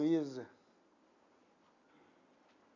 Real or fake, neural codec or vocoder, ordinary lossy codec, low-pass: real; none; none; 7.2 kHz